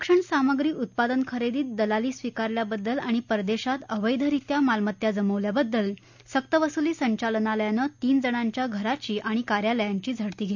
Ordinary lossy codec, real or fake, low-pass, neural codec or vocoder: none; real; 7.2 kHz; none